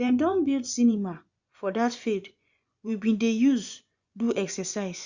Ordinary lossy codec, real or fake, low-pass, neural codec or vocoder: Opus, 64 kbps; fake; 7.2 kHz; vocoder, 24 kHz, 100 mel bands, Vocos